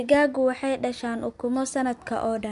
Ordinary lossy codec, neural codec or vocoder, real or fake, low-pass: MP3, 64 kbps; none; real; 10.8 kHz